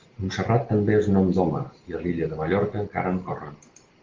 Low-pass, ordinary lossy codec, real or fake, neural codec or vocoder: 7.2 kHz; Opus, 16 kbps; real; none